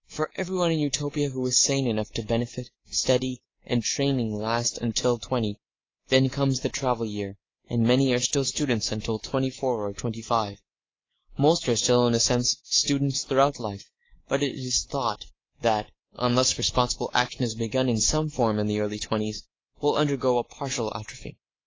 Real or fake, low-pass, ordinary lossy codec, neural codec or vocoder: fake; 7.2 kHz; AAC, 32 kbps; codec, 24 kHz, 3.1 kbps, DualCodec